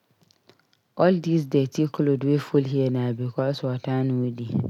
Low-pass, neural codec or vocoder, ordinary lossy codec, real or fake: 19.8 kHz; none; none; real